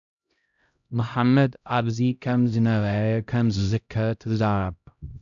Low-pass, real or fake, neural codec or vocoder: 7.2 kHz; fake; codec, 16 kHz, 0.5 kbps, X-Codec, HuBERT features, trained on LibriSpeech